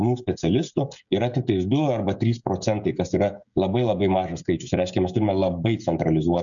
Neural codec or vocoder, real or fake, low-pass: codec, 16 kHz, 16 kbps, FreqCodec, smaller model; fake; 7.2 kHz